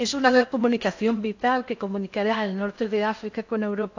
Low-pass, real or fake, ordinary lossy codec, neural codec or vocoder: 7.2 kHz; fake; AAC, 48 kbps; codec, 16 kHz in and 24 kHz out, 0.6 kbps, FocalCodec, streaming, 4096 codes